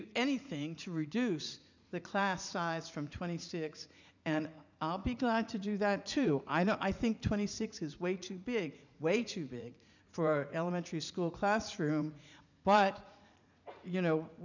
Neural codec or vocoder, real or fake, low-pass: vocoder, 44.1 kHz, 80 mel bands, Vocos; fake; 7.2 kHz